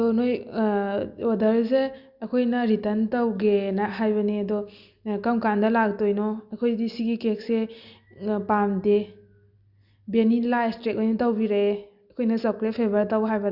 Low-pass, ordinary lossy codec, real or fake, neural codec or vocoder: 5.4 kHz; Opus, 64 kbps; real; none